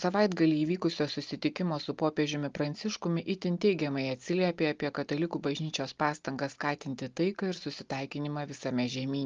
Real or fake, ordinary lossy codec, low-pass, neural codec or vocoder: real; Opus, 32 kbps; 7.2 kHz; none